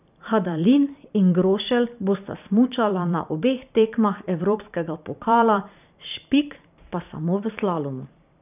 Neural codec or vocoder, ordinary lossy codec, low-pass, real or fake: vocoder, 44.1 kHz, 128 mel bands every 512 samples, BigVGAN v2; none; 3.6 kHz; fake